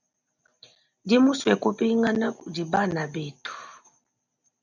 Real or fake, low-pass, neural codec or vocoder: real; 7.2 kHz; none